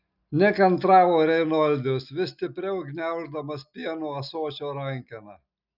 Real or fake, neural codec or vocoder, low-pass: real; none; 5.4 kHz